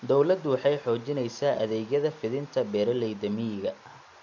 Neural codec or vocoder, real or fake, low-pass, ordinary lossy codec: none; real; 7.2 kHz; MP3, 64 kbps